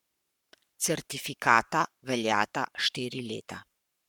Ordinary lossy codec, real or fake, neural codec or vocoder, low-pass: none; fake; codec, 44.1 kHz, 7.8 kbps, Pupu-Codec; 19.8 kHz